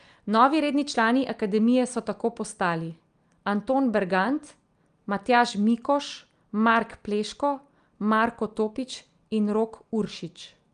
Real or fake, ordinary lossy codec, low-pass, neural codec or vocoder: real; Opus, 32 kbps; 9.9 kHz; none